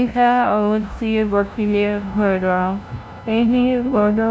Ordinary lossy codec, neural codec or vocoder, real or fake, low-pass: none; codec, 16 kHz, 0.5 kbps, FunCodec, trained on LibriTTS, 25 frames a second; fake; none